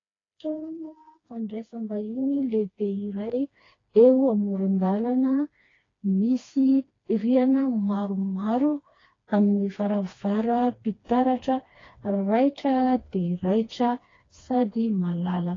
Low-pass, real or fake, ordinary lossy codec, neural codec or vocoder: 7.2 kHz; fake; AAC, 32 kbps; codec, 16 kHz, 2 kbps, FreqCodec, smaller model